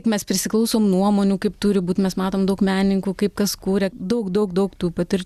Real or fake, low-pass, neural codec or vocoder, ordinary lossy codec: real; 14.4 kHz; none; Opus, 64 kbps